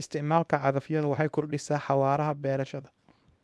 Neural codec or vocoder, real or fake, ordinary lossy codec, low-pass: codec, 24 kHz, 0.9 kbps, WavTokenizer, small release; fake; none; none